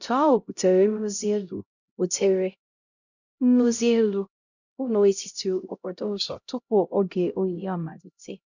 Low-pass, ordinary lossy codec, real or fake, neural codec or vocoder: 7.2 kHz; none; fake; codec, 16 kHz, 0.5 kbps, X-Codec, HuBERT features, trained on LibriSpeech